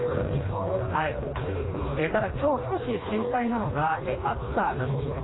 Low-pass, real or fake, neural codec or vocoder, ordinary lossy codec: 7.2 kHz; fake; codec, 16 kHz, 2 kbps, FreqCodec, smaller model; AAC, 16 kbps